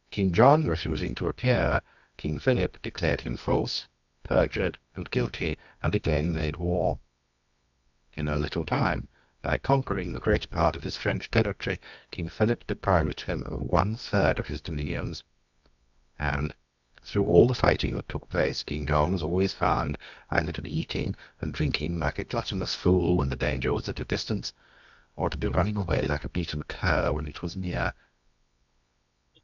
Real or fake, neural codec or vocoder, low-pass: fake; codec, 24 kHz, 0.9 kbps, WavTokenizer, medium music audio release; 7.2 kHz